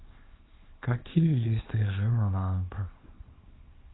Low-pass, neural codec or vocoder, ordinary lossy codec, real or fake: 7.2 kHz; codec, 24 kHz, 0.9 kbps, WavTokenizer, small release; AAC, 16 kbps; fake